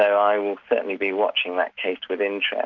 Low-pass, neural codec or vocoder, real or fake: 7.2 kHz; none; real